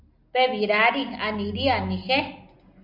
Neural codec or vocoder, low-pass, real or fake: none; 5.4 kHz; real